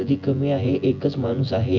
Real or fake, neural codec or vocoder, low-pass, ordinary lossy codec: fake; vocoder, 24 kHz, 100 mel bands, Vocos; 7.2 kHz; none